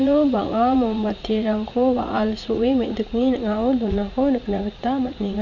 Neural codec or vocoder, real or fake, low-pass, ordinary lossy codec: vocoder, 44.1 kHz, 80 mel bands, Vocos; fake; 7.2 kHz; none